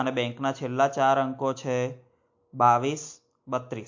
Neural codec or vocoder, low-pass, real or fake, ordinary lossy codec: none; 7.2 kHz; real; MP3, 48 kbps